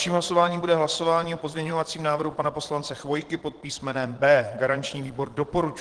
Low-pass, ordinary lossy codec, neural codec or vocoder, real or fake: 9.9 kHz; Opus, 16 kbps; vocoder, 22.05 kHz, 80 mel bands, Vocos; fake